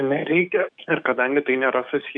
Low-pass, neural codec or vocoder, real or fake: 9.9 kHz; codec, 44.1 kHz, 7.8 kbps, DAC; fake